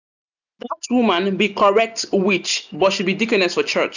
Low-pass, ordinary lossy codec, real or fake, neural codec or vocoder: 7.2 kHz; none; real; none